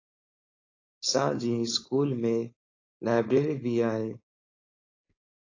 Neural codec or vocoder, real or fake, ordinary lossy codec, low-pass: codec, 16 kHz, 4.8 kbps, FACodec; fake; AAC, 32 kbps; 7.2 kHz